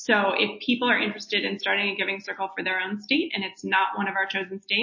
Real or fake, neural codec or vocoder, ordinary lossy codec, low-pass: real; none; MP3, 32 kbps; 7.2 kHz